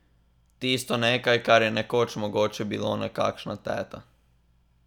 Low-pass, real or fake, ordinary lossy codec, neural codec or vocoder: 19.8 kHz; real; none; none